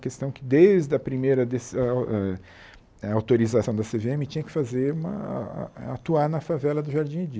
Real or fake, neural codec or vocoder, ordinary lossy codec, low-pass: real; none; none; none